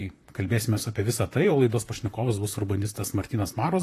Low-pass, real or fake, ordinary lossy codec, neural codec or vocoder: 14.4 kHz; fake; AAC, 48 kbps; vocoder, 44.1 kHz, 128 mel bands, Pupu-Vocoder